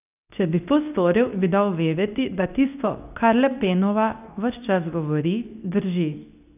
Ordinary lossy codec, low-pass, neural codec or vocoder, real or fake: none; 3.6 kHz; codec, 24 kHz, 0.9 kbps, WavTokenizer, medium speech release version 2; fake